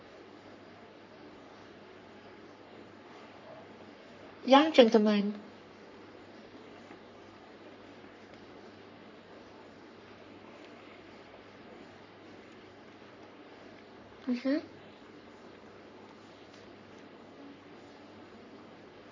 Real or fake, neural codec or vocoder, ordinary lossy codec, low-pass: fake; codec, 44.1 kHz, 3.4 kbps, Pupu-Codec; MP3, 48 kbps; 7.2 kHz